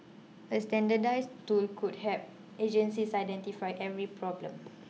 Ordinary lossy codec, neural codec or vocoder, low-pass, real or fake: none; none; none; real